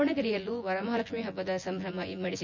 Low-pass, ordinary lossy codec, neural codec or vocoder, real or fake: 7.2 kHz; MP3, 64 kbps; vocoder, 24 kHz, 100 mel bands, Vocos; fake